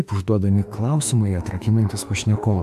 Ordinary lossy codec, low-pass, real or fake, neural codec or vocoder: AAC, 96 kbps; 14.4 kHz; fake; autoencoder, 48 kHz, 32 numbers a frame, DAC-VAE, trained on Japanese speech